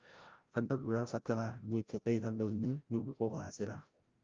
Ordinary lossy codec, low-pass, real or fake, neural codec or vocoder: Opus, 32 kbps; 7.2 kHz; fake; codec, 16 kHz, 0.5 kbps, FreqCodec, larger model